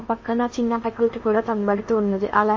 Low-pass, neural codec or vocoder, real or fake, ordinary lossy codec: 7.2 kHz; codec, 16 kHz in and 24 kHz out, 0.8 kbps, FocalCodec, streaming, 65536 codes; fake; MP3, 32 kbps